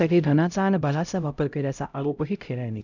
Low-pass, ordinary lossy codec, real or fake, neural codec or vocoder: 7.2 kHz; MP3, 64 kbps; fake; codec, 16 kHz, 0.5 kbps, X-Codec, HuBERT features, trained on LibriSpeech